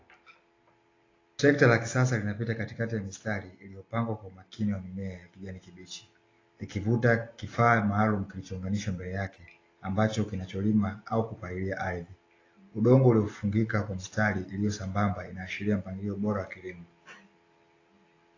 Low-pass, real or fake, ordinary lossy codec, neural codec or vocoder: 7.2 kHz; real; AAC, 32 kbps; none